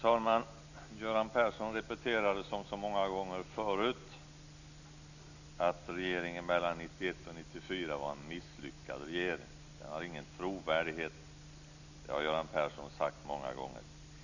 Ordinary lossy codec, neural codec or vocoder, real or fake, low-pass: none; none; real; 7.2 kHz